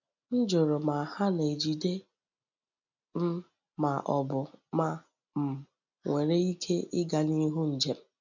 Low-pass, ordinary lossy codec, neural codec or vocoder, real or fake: 7.2 kHz; none; none; real